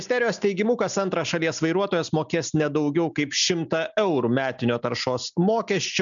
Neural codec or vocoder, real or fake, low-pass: none; real; 7.2 kHz